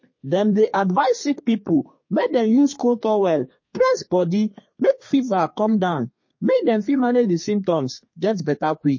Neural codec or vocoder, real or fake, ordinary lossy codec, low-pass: codec, 32 kHz, 1.9 kbps, SNAC; fake; MP3, 32 kbps; 7.2 kHz